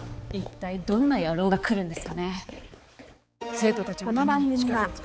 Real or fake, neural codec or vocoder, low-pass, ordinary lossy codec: fake; codec, 16 kHz, 4 kbps, X-Codec, HuBERT features, trained on balanced general audio; none; none